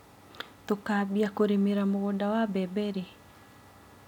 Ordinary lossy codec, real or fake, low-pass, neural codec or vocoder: none; real; 19.8 kHz; none